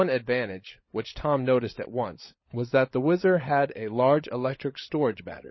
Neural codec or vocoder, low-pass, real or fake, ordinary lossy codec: codec, 16 kHz, 16 kbps, FunCodec, trained on LibriTTS, 50 frames a second; 7.2 kHz; fake; MP3, 24 kbps